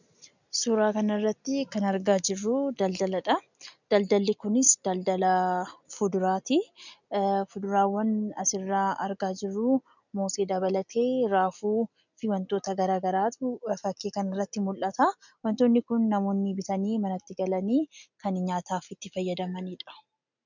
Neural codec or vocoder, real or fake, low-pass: none; real; 7.2 kHz